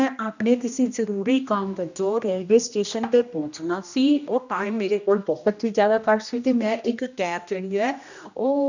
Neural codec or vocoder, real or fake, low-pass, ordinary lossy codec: codec, 16 kHz, 1 kbps, X-Codec, HuBERT features, trained on general audio; fake; 7.2 kHz; none